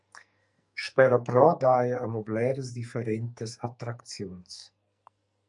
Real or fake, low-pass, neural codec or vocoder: fake; 10.8 kHz; codec, 44.1 kHz, 2.6 kbps, SNAC